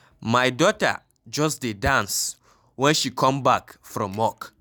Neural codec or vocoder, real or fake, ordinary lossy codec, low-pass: none; real; none; none